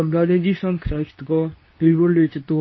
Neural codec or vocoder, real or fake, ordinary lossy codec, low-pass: codec, 24 kHz, 0.9 kbps, WavTokenizer, medium speech release version 2; fake; MP3, 24 kbps; 7.2 kHz